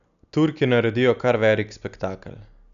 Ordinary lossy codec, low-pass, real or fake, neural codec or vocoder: none; 7.2 kHz; real; none